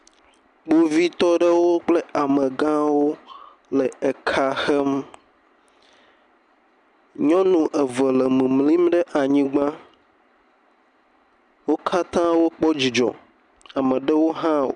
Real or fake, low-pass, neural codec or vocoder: real; 10.8 kHz; none